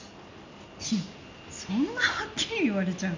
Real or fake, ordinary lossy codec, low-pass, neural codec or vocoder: real; MP3, 64 kbps; 7.2 kHz; none